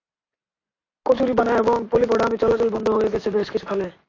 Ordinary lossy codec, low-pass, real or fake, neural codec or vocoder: AAC, 32 kbps; 7.2 kHz; real; none